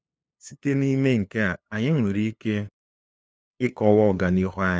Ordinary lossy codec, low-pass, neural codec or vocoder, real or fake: none; none; codec, 16 kHz, 2 kbps, FunCodec, trained on LibriTTS, 25 frames a second; fake